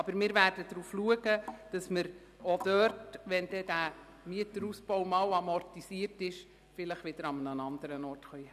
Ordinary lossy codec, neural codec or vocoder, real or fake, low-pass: none; none; real; 14.4 kHz